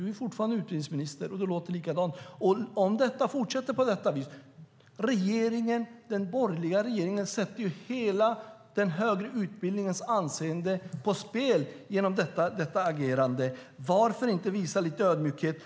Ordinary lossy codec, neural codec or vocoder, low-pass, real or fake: none; none; none; real